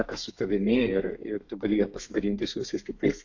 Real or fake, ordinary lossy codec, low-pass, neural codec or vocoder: fake; AAC, 48 kbps; 7.2 kHz; codec, 32 kHz, 1.9 kbps, SNAC